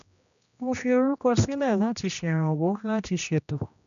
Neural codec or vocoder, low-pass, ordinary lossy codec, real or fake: codec, 16 kHz, 1 kbps, X-Codec, HuBERT features, trained on general audio; 7.2 kHz; none; fake